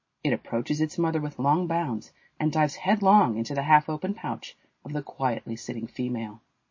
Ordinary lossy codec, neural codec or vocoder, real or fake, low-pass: MP3, 32 kbps; none; real; 7.2 kHz